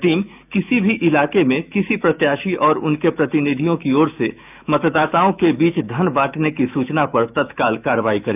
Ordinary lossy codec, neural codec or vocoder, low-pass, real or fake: none; autoencoder, 48 kHz, 128 numbers a frame, DAC-VAE, trained on Japanese speech; 3.6 kHz; fake